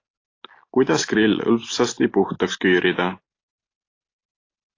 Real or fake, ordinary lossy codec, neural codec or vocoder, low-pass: real; AAC, 32 kbps; none; 7.2 kHz